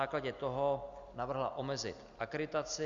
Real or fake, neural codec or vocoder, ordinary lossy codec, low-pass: real; none; Opus, 64 kbps; 7.2 kHz